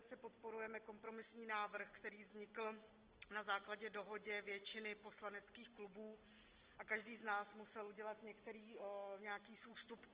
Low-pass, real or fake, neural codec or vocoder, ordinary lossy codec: 3.6 kHz; real; none; Opus, 16 kbps